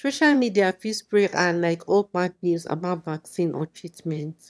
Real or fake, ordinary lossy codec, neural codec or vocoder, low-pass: fake; none; autoencoder, 22.05 kHz, a latent of 192 numbers a frame, VITS, trained on one speaker; none